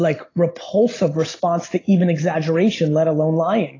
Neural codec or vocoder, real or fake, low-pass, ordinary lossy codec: none; real; 7.2 kHz; AAC, 32 kbps